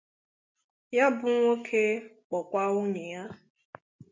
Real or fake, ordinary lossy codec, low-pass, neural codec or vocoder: real; MP3, 64 kbps; 7.2 kHz; none